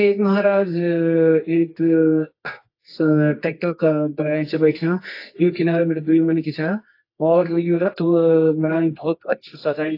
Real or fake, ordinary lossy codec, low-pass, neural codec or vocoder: fake; AAC, 32 kbps; 5.4 kHz; codec, 24 kHz, 0.9 kbps, WavTokenizer, medium music audio release